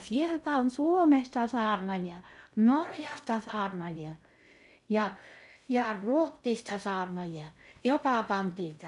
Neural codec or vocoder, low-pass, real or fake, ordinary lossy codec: codec, 16 kHz in and 24 kHz out, 0.6 kbps, FocalCodec, streaming, 2048 codes; 10.8 kHz; fake; none